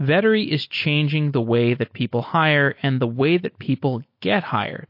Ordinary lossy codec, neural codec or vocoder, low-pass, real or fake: MP3, 32 kbps; none; 5.4 kHz; real